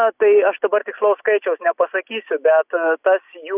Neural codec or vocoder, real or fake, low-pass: none; real; 3.6 kHz